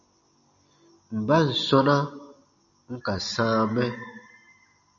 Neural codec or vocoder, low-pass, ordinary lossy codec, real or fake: none; 7.2 kHz; MP3, 64 kbps; real